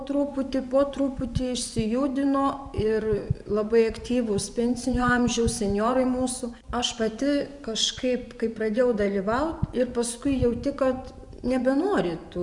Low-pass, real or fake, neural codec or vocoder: 10.8 kHz; fake; vocoder, 24 kHz, 100 mel bands, Vocos